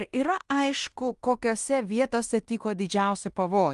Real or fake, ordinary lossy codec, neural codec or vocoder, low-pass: fake; Opus, 32 kbps; codec, 16 kHz in and 24 kHz out, 0.9 kbps, LongCat-Audio-Codec, fine tuned four codebook decoder; 10.8 kHz